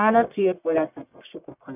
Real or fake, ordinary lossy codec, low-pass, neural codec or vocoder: fake; none; 3.6 kHz; codec, 44.1 kHz, 1.7 kbps, Pupu-Codec